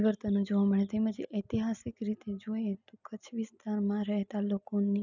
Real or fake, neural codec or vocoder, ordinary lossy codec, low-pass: real; none; none; 7.2 kHz